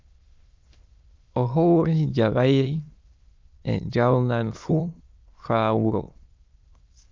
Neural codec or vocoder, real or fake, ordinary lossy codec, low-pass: autoencoder, 22.05 kHz, a latent of 192 numbers a frame, VITS, trained on many speakers; fake; Opus, 24 kbps; 7.2 kHz